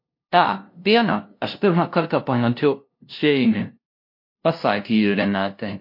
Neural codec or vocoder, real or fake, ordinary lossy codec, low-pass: codec, 16 kHz, 0.5 kbps, FunCodec, trained on LibriTTS, 25 frames a second; fake; MP3, 32 kbps; 5.4 kHz